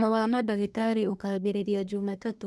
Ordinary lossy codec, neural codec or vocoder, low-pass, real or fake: none; codec, 24 kHz, 1 kbps, SNAC; none; fake